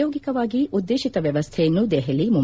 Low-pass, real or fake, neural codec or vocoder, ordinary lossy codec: none; real; none; none